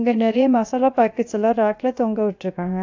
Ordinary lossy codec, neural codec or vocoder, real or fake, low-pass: AAC, 48 kbps; codec, 16 kHz, 0.7 kbps, FocalCodec; fake; 7.2 kHz